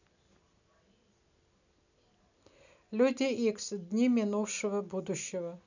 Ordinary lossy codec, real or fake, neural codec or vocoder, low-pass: none; real; none; 7.2 kHz